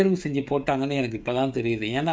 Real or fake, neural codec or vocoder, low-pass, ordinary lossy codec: fake; codec, 16 kHz, 16 kbps, FreqCodec, smaller model; none; none